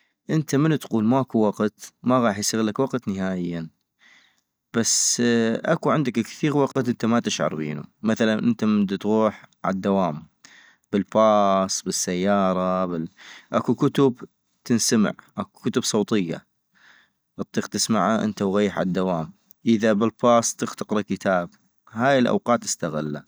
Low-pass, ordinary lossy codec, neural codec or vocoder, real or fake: none; none; none; real